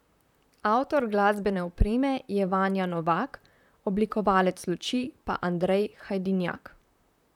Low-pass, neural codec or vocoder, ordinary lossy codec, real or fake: 19.8 kHz; vocoder, 44.1 kHz, 128 mel bands, Pupu-Vocoder; none; fake